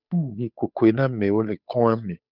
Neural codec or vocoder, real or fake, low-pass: codec, 16 kHz, 8 kbps, FunCodec, trained on Chinese and English, 25 frames a second; fake; 5.4 kHz